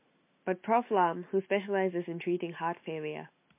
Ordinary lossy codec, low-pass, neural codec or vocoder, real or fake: MP3, 24 kbps; 3.6 kHz; none; real